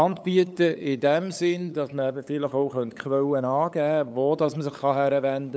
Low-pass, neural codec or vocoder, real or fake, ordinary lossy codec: none; codec, 16 kHz, 8 kbps, FunCodec, trained on LibriTTS, 25 frames a second; fake; none